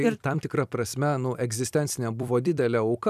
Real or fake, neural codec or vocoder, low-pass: fake; vocoder, 44.1 kHz, 128 mel bands every 256 samples, BigVGAN v2; 14.4 kHz